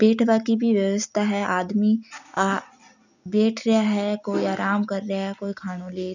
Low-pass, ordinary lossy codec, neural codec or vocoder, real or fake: 7.2 kHz; none; none; real